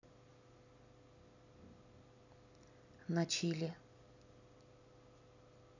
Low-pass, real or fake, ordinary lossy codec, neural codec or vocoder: 7.2 kHz; real; none; none